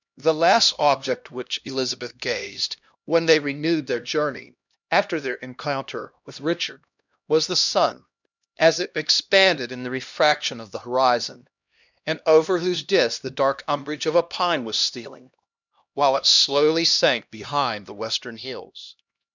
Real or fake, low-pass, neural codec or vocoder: fake; 7.2 kHz; codec, 16 kHz, 1 kbps, X-Codec, HuBERT features, trained on LibriSpeech